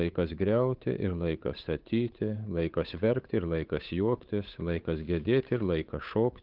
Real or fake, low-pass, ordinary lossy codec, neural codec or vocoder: fake; 5.4 kHz; Opus, 24 kbps; codec, 16 kHz, 8 kbps, FunCodec, trained on Chinese and English, 25 frames a second